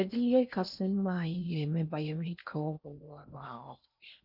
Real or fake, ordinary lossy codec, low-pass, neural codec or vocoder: fake; none; 5.4 kHz; codec, 16 kHz in and 24 kHz out, 0.6 kbps, FocalCodec, streaming, 4096 codes